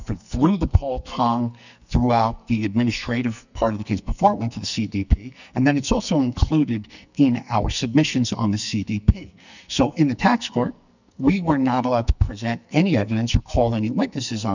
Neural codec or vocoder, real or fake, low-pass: codec, 44.1 kHz, 2.6 kbps, SNAC; fake; 7.2 kHz